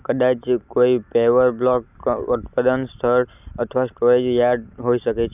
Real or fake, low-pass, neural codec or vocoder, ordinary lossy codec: real; 3.6 kHz; none; none